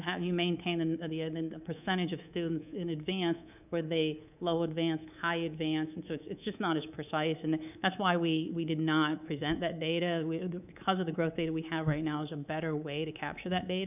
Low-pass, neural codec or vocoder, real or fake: 3.6 kHz; codec, 24 kHz, 3.1 kbps, DualCodec; fake